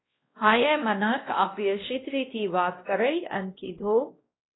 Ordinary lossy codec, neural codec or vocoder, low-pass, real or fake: AAC, 16 kbps; codec, 16 kHz, 1 kbps, X-Codec, WavLM features, trained on Multilingual LibriSpeech; 7.2 kHz; fake